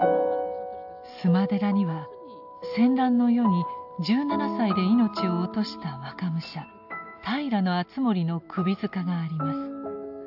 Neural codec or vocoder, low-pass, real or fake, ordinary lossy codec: none; 5.4 kHz; real; AAC, 48 kbps